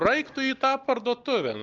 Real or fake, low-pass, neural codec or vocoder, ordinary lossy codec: real; 7.2 kHz; none; Opus, 24 kbps